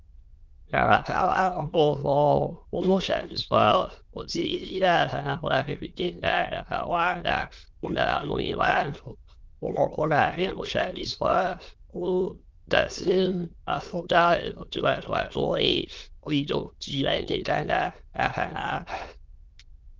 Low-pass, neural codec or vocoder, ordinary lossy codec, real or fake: 7.2 kHz; autoencoder, 22.05 kHz, a latent of 192 numbers a frame, VITS, trained on many speakers; Opus, 32 kbps; fake